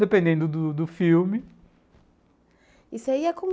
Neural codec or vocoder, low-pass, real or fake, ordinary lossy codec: none; none; real; none